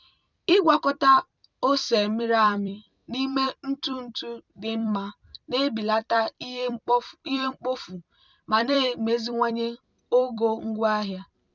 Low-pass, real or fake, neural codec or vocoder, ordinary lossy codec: 7.2 kHz; fake; vocoder, 44.1 kHz, 128 mel bands every 256 samples, BigVGAN v2; none